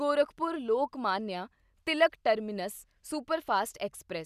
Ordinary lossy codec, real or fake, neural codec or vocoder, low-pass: none; real; none; 14.4 kHz